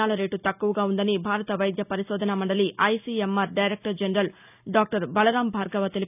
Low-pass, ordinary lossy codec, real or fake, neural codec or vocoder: 3.6 kHz; none; real; none